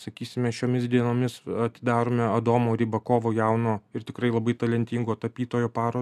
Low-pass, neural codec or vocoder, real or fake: 14.4 kHz; none; real